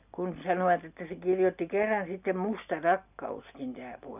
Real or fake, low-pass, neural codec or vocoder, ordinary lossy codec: real; 3.6 kHz; none; none